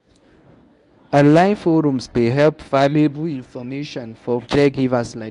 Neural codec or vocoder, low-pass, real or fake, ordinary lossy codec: codec, 24 kHz, 0.9 kbps, WavTokenizer, medium speech release version 1; 10.8 kHz; fake; none